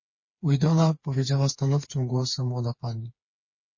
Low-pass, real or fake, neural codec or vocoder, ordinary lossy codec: 7.2 kHz; fake; codec, 16 kHz, 4 kbps, FreqCodec, smaller model; MP3, 32 kbps